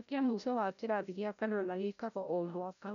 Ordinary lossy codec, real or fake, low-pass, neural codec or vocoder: none; fake; 7.2 kHz; codec, 16 kHz, 0.5 kbps, FreqCodec, larger model